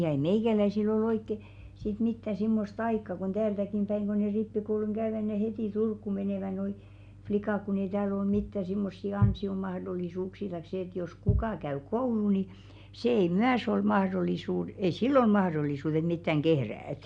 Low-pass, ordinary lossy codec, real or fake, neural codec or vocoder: 9.9 kHz; none; real; none